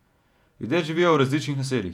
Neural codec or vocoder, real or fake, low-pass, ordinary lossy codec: vocoder, 48 kHz, 128 mel bands, Vocos; fake; 19.8 kHz; none